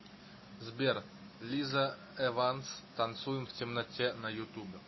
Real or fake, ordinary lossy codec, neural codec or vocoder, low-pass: real; MP3, 24 kbps; none; 7.2 kHz